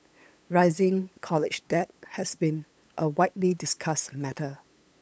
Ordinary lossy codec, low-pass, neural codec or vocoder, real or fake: none; none; codec, 16 kHz, 8 kbps, FunCodec, trained on LibriTTS, 25 frames a second; fake